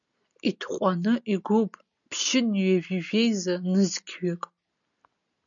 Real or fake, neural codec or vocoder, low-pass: real; none; 7.2 kHz